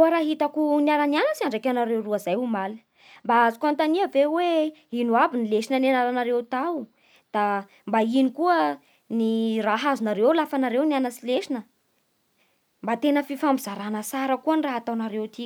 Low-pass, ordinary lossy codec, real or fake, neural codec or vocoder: none; none; real; none